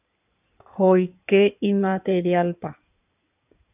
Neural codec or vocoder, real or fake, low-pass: codec, 16 kHz in and 24 kHz out, 2.2 kbps, FireRedTTS-2 codec; fake; 3.6 kHz